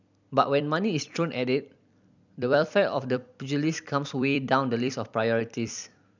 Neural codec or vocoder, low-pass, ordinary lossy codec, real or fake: vocoder, 44.1 kHz, 128 mel bands every 256 samples, BigVGAN v2; 7.2 kHz; none; fake